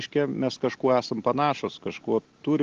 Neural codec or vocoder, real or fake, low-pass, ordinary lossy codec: none; real; 7.2 kHz; Opus, 16 kbps